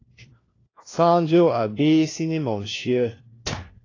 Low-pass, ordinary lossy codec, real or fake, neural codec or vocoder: 7.2 kHz; AAC, 32 kbps; fake; codec, 16 kHz, 1 kbps, FunCodec, trained on LibriTTS, 50 frames a second